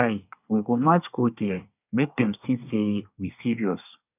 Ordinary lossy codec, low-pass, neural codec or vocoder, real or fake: none; 3.6 kHz; codec, 24 kHz, 1 kbps, SNAC; fake